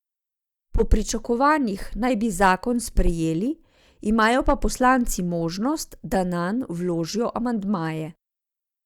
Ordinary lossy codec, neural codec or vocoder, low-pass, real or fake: Opus, 64 kbps; none; 19.8 kHz; real